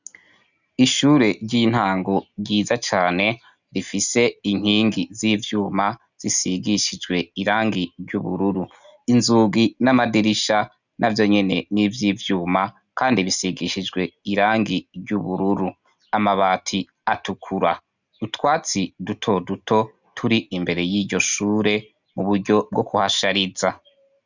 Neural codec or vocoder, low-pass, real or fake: none; 7.2 kHz; real